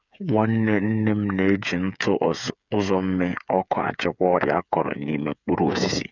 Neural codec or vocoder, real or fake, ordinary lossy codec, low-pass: codec, 16 kHz, 8 kbps, FreqCodec, smaller model; fake; none; 7.2 kHz